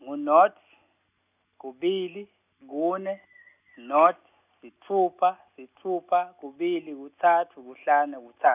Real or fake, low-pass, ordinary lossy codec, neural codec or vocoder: real; 3.6 kHz; none; none